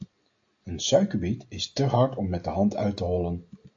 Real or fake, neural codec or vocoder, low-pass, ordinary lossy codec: real; none; 7.2 kHz; AAC, 48 kbps